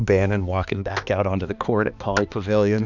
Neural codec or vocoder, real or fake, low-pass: codec, 16 kHz, 2 kbps, X-Codec, HuBERT features, trained on balanced general audio; fake; 7.2 kHz